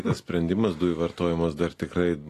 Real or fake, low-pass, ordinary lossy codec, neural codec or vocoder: real; 14.4 kHz; AAC, 48 kbps; none